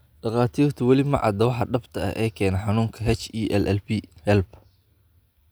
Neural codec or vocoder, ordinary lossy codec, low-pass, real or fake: none; none; none; real